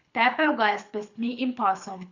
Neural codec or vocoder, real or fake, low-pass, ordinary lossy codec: codec, 24 kHz, 6 kbps, HILCodec; fake; 7.2 kHz; Opus, 64 kbps